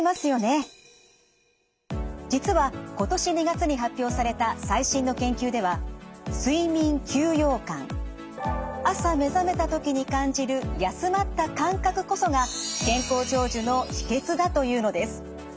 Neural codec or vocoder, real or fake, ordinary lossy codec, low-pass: none; real; none; none